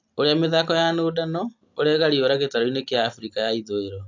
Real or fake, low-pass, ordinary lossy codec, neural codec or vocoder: real; 7.2 kHz; AAC, 48 kbps; none